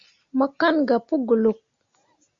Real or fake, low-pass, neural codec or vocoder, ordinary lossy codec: real; 7.2 kHz; none; Opus, 64 kbps